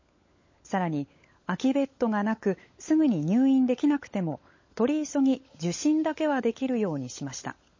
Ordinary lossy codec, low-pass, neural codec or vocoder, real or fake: MP3, 32 kbps; 7.2 kHz; codec, 16 kHz, 16 kbps, FunCodec, trained on LibriTTS, 50 frames a second; fake